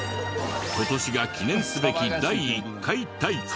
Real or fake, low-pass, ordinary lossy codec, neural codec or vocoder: real; none; none; none